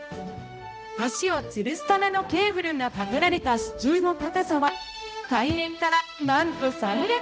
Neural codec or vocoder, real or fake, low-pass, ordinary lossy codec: codec, 16 kHz, 0.5 kbps, X-Codec, HuBERT features, trained on balanced general audio; fake; none; none